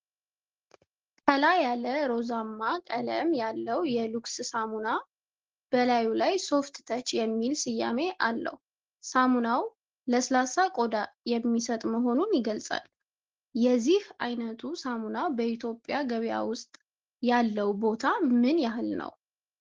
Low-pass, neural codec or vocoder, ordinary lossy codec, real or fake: 7.2 kHz; none; Opus, 16 kbps; real